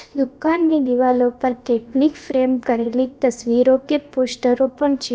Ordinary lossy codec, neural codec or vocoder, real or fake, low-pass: none; codec, 16 kHz, about 1 kbps, DyCAST, with the encoder's durations; fake; none